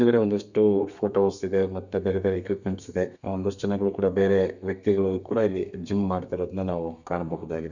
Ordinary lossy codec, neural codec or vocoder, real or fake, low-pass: none; codec, 32 kHz, 1.9 kbps, SNAC; fake; 7.2 kHz